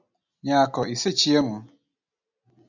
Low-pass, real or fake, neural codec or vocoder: 7.2 kHz; real; none